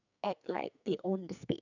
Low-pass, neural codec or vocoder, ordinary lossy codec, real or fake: 7.2 kHz; codec, 44.1 kHz, 2.6 kbps, SNAC; none; fake